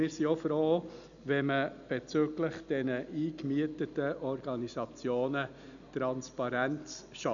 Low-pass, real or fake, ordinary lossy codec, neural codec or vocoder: 7.2 kHz; real; none; none